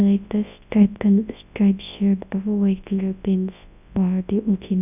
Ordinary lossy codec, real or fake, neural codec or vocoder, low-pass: none; fake; codec, 24 kHz, 0.9 kbps, WavTokenizer, large speech release; 3.6 kHz